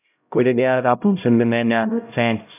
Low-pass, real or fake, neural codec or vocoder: 3.6 kHz; fake; codec, 16 kHz, 0.5 kbps, X-Codec, HuBERT features, trained on LibriSpeech